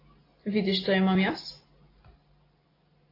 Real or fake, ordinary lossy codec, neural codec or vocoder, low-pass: real; AAC, 24 kbps; none; 5.4 kHz